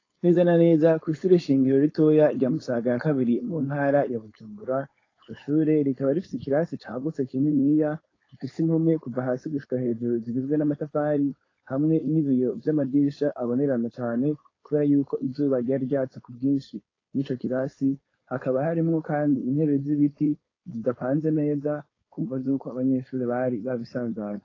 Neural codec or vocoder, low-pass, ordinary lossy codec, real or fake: codec, 16 kHz, 4.8 kbps, FACodec; 7.2 kHz; AAC, 32 kbps; fake